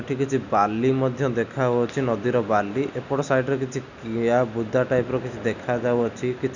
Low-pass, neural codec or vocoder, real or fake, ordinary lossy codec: 7.2 kHz; none; real; none